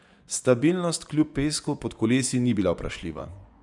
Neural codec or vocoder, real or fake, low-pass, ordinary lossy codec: vocoder, 24 kHz, 100 mel bands, Vocos; fake; 10.8 kHz; none